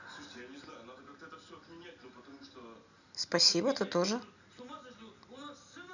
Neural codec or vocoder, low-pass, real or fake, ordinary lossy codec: none; 7.2 kHz; real; none